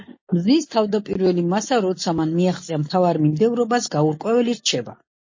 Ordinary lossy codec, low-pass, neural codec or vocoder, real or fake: MP3, 32 kbps; 7.2 kHz; none; real